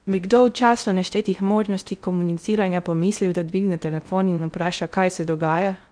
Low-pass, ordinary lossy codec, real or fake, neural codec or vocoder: 9.9 kHz; none; fake; codec, 16 kHz in and 24 kHz out, 0.6 kbps, FocalCodec, streaming, 4096 codes